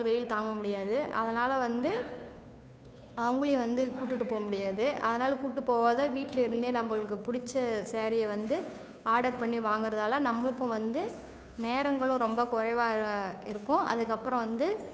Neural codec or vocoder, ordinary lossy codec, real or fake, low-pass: codec, 16 kHz, 2 kbps, FunCodec, trained on Chinese and English, 25 frames a second; none; fake; none